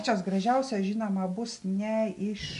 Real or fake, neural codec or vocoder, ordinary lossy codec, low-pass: real; none; MP3, 64 kbps; 10.8 kHz